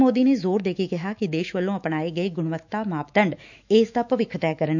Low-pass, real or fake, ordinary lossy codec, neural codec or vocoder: 7.2 kHz; fake; none; autoencoder, 48 kHz, 128 numbers a frame, DAC-VAE, trained on Japanese speech